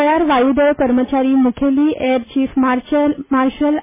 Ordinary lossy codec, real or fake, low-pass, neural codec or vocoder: MP3, 16 kbps; real; 3.6 kHz; none